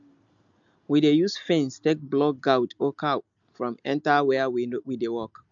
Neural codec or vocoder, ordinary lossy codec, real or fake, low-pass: none; MP3, 64 kbps; real; 7.2 kHz